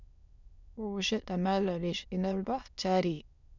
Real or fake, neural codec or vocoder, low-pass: fake; autoencoder, 22.05 kHz, a latent of 192 numbers a frame, VITS, trained on many speakers; 7.2 kHz